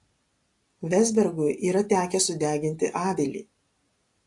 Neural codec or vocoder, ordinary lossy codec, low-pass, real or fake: none; AAC, 48 kbps; 10.8 kHz; real